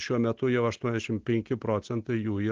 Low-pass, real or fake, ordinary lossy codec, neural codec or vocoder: 7.2 kHz; real; Opus, 16 kbps; none